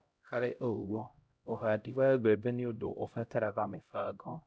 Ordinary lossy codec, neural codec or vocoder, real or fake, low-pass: none; codec, 16 kHz, 0.5 kbps, X-Codec, HuBERT features, trained on LibriSpeech; fake; none